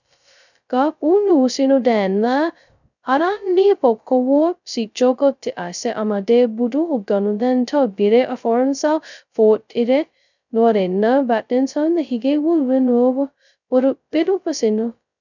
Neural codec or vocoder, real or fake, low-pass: codec, 16 kHz, 0.2 kbps, FocalCodec; fake; 7.2 kHz